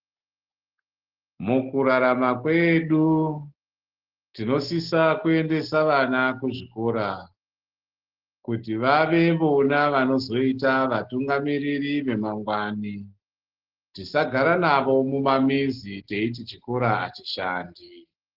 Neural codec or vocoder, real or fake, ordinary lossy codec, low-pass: none; real; Opus, 16 kbps; 5.4 kHz